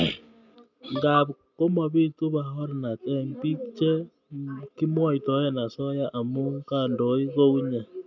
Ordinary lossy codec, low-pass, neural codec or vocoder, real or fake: none; 7.2 kHz; none; real